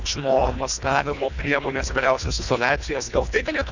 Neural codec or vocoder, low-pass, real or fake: codec, 24 kHz, 1.5 kbps, HILCodec; 7.2 kHz; fake